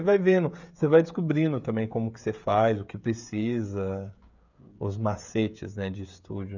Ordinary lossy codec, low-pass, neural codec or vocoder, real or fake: none; 7.2 kHz; codec, 16 kHz, 16 kbps, FreqCodec, smaller model; fake